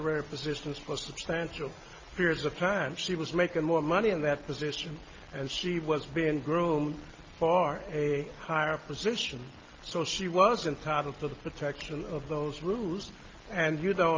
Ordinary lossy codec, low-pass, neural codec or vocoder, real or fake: Opus, 32 kbps; 7.2 kHz; none; real